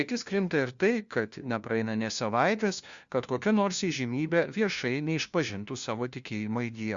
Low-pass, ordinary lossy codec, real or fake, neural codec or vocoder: 7.2 kHz; Opus, 64 kbps; fake; codec, 16 kHz, 1 kbps, FunCodec, trained on LibriTTS, 50 frames a second